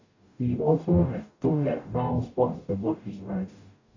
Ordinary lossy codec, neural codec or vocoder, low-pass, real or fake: none; codec, 44.1 kHz, 0.9 kbps, DAC; 7.2 kHz; fake